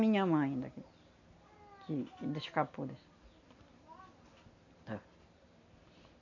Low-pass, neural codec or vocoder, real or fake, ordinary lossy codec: 7.2 kHz; none; real; none